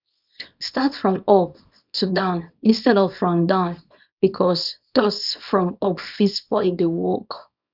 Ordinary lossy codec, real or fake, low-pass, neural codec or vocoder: none; fake; 5.4 kHz; codec, 24 kHz, 0.9 kbps, WavTokenizer, small release